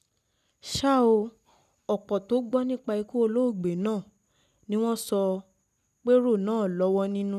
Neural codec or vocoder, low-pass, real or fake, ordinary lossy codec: none; 14.4 kHz; real; none